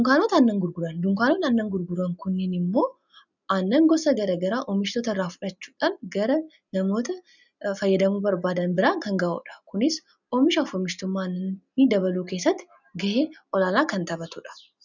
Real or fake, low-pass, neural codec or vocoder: real; 7.2 kHz; none